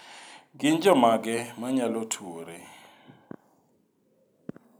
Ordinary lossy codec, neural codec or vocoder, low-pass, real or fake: none; none; none; real